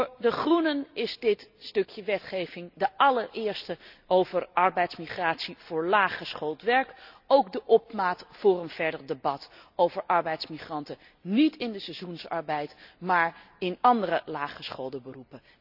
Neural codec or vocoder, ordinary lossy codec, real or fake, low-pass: none; none; real; 5.4 kHz